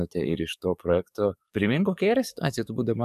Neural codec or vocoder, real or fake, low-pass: codec, 44.1 kHz, 7.8 kbps, DAC; fake; 14.4 kHz